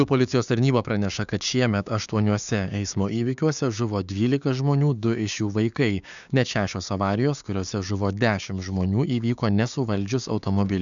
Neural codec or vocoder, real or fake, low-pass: codec, 16 kHz, 6 kbps, DAC; fake; 7.2 kHz